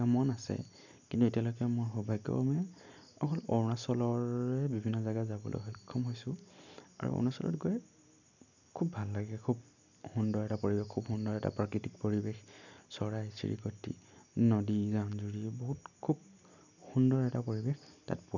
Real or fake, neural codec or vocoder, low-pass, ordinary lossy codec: real; none; 7.2 kHz; none